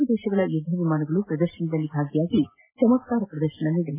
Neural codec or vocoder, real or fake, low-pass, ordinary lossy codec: none; real; 3.6 kHz; AAC, 24 kbps